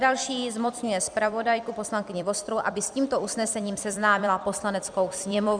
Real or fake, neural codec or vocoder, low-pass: fake; vocoder, 44.1 kHz, 128 mel bands every 256 samples, BigVGAN v2; 9.9 kHz